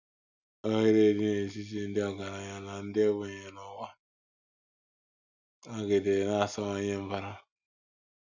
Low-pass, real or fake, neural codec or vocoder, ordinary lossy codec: 7.2 kHz; real; none; none